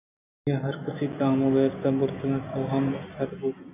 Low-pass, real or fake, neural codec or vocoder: 3.6 kHz; real; none